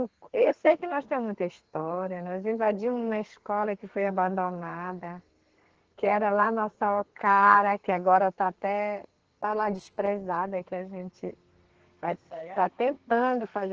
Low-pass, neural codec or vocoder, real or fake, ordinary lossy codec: 7.2 kHz; codec, 32 kHz, 1.9 kbps, SNAC; fake; Opus, 16 kbps